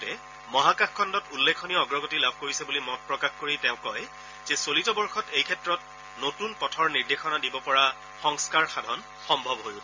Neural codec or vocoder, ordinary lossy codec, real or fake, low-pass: none; MP3, 64 kbps; real; 7.2 kHz